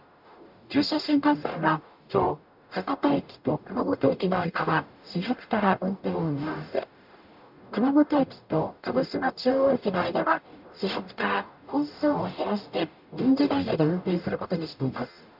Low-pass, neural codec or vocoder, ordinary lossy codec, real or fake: 5.4 kHz; codec, 44.1 kHz, 0.9 kbps, DAC; none; fake